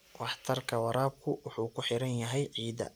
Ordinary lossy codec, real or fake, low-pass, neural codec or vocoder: none; real; none; none